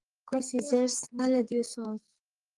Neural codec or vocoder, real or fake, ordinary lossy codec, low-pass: codec, 44.1 kHz, 2.6 kbps, SNAC; fake; Opus, 24 kbps; 10.8 kHz